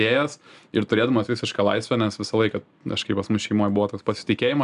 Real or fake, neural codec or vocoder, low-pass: real; none; 10.8 kHz